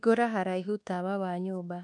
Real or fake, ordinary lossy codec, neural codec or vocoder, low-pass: fake; none; codec, 24 kHz, 1.2 kbps, DualCodec; 10.8 kHz